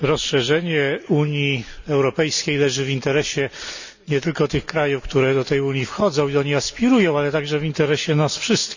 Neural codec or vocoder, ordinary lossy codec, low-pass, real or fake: none; none; 7.2 kHz; real